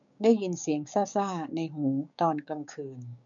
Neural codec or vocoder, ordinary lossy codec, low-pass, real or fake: codec, 16 kHz, 6 kbps, DAC; none; 7.2 kHz; fake